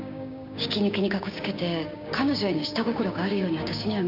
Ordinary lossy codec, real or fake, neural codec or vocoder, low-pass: none; fake; codec, 16 kHz, 6 kbps, DAC; 5.4 kHz